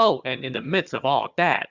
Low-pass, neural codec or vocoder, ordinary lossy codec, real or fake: 7.2 kHz; vocoder, 22.05 kHz, 80 mel bands, HiFi-GAN; Opus, 64 kbps; fake